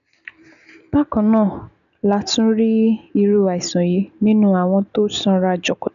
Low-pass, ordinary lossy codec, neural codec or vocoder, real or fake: 7.2 kHz; none; none; real